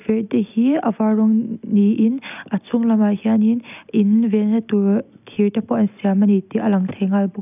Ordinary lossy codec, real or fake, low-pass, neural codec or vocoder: none; real; 3.6 kHz; none